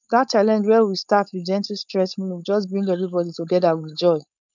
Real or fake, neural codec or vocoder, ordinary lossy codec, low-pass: fake; codec, 16 kHz, 4.8 kbps, FACodec; none; 7.2 kHz